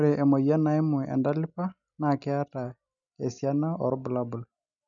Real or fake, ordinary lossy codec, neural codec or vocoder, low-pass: real; none; none; 7.2 kHz